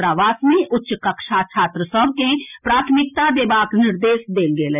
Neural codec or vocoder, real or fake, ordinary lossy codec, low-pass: none; real; none; 3.6 kHz